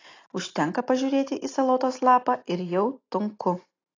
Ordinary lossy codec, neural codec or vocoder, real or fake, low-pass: AAC, 32 kbps; none; real; 7.2 kHz